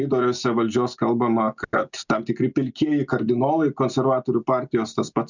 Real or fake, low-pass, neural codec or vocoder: real; 7.2 kHz; none